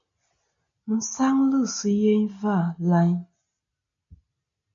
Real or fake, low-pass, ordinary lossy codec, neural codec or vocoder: real; 7.2 kHz; MP3, 64 kbps; none